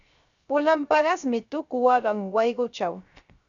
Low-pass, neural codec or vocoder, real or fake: 7.2 kHz; codec, 16 kHz, 0.3 kbps, FocalCodec; fake